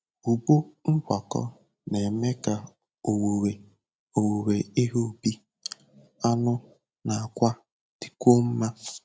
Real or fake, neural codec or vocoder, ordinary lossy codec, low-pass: real; none; none; none